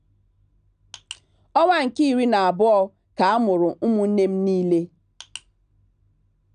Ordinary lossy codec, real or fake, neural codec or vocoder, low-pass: none; real; none; 9.9 kHz